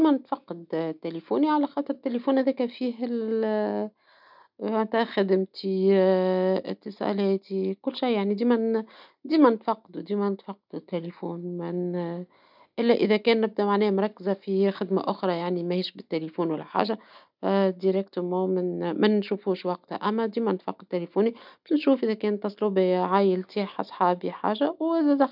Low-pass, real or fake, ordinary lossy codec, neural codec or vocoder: 5.4 kHz; real; none; none